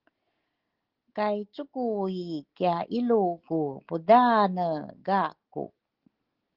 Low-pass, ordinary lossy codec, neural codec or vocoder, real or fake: 5.4 kHz; Opus, 16 kbps; none; real